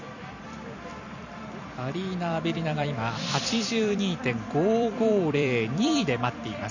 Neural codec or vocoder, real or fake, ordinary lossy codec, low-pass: vocoder, 44.1 kHz, 128 mel bands every 256 samples, BigVGAN v2; fake; none; 7.2 kHz